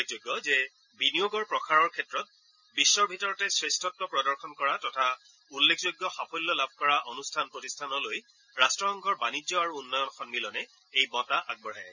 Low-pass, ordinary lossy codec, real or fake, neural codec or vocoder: 7.2 kHz; none; real; none